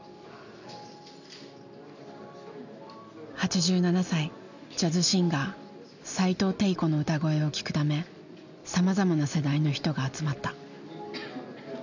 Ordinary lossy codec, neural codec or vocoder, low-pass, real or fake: none; none; 7.2 kHz; real